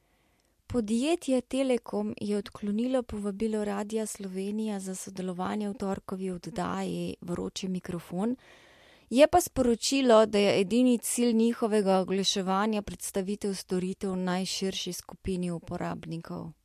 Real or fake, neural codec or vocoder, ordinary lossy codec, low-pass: real; none; MP3, 64 kbps; 14.4 kHz